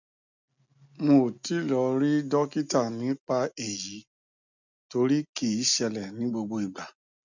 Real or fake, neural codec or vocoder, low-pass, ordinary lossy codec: real; none; 7.2 kHz; none